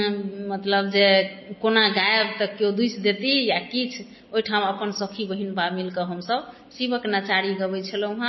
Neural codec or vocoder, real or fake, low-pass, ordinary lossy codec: none; real; 7.2 kHz; MP3, 24 kbps